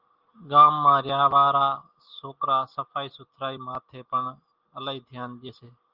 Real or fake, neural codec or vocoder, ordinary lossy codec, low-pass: real; none; Opus, 32 kbps; 5.4 kHz